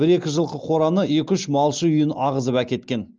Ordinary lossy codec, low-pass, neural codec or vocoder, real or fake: Opus, 32 kbps; 7.2 kHz; none; real